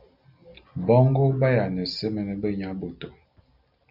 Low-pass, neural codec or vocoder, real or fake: 5.4 kHz; none; real